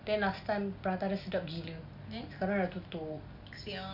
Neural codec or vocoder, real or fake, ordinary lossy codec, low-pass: none; real; none; 5.4 kHz